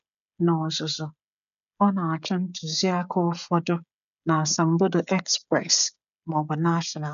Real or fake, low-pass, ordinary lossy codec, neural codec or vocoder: fake; 7.2 kHz; none; codec, 16 kHz, 16 kbps, FreqCodec, smaller model